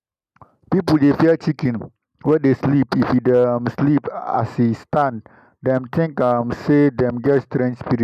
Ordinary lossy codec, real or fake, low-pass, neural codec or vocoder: none; real; 14.4 kHz; none